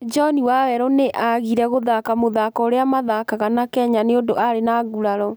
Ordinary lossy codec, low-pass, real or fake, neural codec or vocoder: none; none; real; none